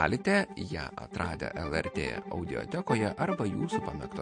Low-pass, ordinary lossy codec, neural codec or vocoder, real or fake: 9.9 kHz; MP3, 48 kbps; none; real